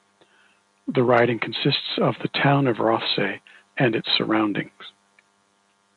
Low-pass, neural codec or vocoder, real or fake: 10.8 kHz; none; real